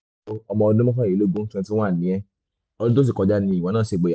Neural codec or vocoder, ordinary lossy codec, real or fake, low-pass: none; none; real; none